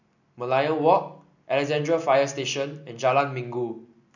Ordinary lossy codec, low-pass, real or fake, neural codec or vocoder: none; 7.2 kHz; real; none